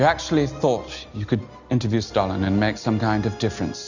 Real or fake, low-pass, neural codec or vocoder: real; 7.2 kHz; none